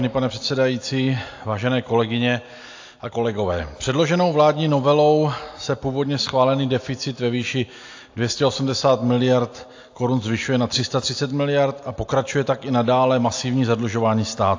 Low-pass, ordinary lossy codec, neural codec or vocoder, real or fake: 7.2 kHz; AAC, 48 kbps; none; real